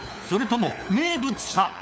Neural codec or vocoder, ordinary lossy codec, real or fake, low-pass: codec, 16 kHz, 4 kbps, FunCodec, trained on LibriTTS, 50 frames a second; none; fake; none